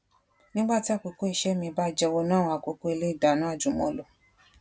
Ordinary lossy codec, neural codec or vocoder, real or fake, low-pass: none; none; real; none